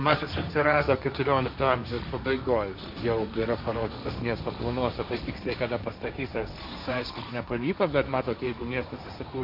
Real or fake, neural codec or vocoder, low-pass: fake; codec, 16 kHz, 1.1 kbps, Voila-Tokenizer; 5.4 kHz